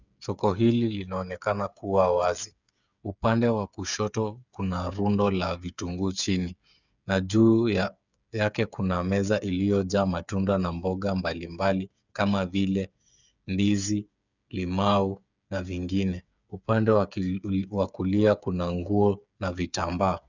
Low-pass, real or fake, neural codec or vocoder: 7.2 kHz; fake; codec, 16 kHz, 8 kbps, FreqCodec, smaller model